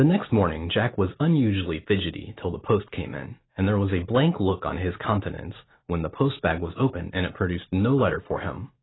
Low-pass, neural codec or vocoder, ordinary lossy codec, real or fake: 7.2 kHz; none; AAC, 16 kbps; real